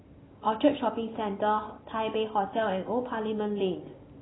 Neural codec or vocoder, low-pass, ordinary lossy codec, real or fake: none; 7.2 kHz; AAC, 16 kbps; real